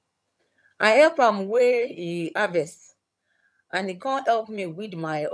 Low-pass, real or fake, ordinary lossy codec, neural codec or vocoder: none; fake; none; vocoder, 22.05 kHz, 80 mel bands, HiFi-GAN